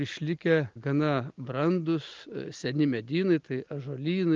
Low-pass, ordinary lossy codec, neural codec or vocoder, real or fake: 7.2 kHz; Opus, 24 kbps; none; real